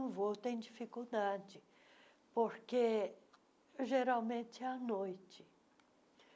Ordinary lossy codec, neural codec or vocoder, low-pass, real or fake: none; none; none; real